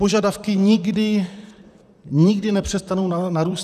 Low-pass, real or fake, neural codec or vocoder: 14.4 kHz; fake; vocoder, 44.1 kHz, 128 mel bands, Pupu-Vocoder